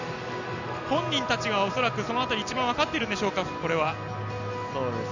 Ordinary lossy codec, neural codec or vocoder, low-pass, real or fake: none; none; 7.2 kHz; real